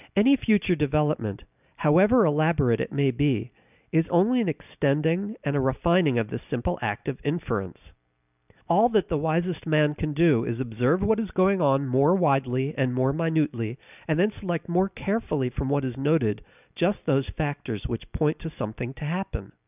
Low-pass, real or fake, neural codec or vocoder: 3.6 kHz; real; none